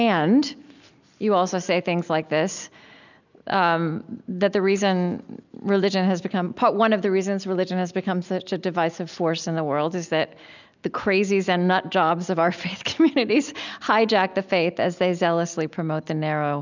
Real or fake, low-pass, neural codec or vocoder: real; 7.2 kHz; none